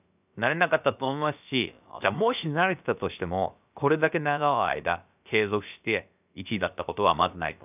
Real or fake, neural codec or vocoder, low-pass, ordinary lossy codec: fake; codec, 16 kHz, about 1 kbps, DyCAST, with the encoder's durations; 3.6 kHz; none